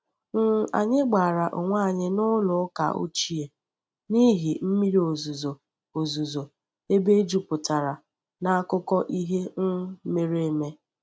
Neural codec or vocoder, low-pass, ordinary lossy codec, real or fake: none; none; none; real